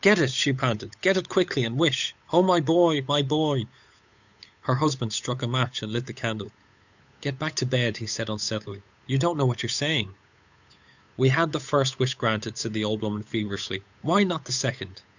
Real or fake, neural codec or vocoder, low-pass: fake; codec, 16 kHz, 8 kbps, FunCodec, trained on Chinese and English, 25 frames a second; 7.2 kHz